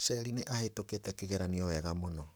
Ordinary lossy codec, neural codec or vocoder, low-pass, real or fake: none; codec, 44.1 kHz, 7.8 kbps, Pupu-Codec; none; fake